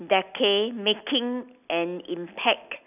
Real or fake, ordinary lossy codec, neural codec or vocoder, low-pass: real; none; none; 3.6 kHz